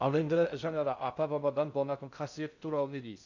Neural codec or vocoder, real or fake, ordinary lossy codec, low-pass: codec, 16 kHz in and 24 kHz out, 0.6 kbps, FocalCodec, streaming, 2048 codes; fake; none; 7.2 kHz